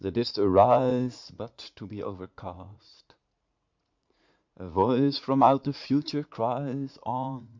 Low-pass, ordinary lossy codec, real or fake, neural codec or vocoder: 7.2 kHz; MP3, 64 kbps; fake; vocoder, 44.1 kHz, 80 mel bands, Vocos